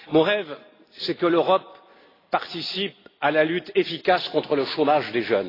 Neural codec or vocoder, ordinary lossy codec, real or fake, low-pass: none; AAC, 24 kbps; real; 5.4 kHz